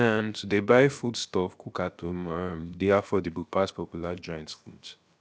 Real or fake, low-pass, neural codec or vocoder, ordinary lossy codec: fake; none; codec, 16 kHz, about 1 kbps, DyCAST, with the encoder's durations; none